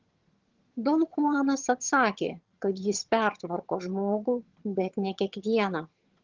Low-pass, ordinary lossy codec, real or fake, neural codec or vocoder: 7.2 kHz; Opus, 16 kbps; fake; vocoder, 22.05 kHz, 80 mel bands, HiFi-GAN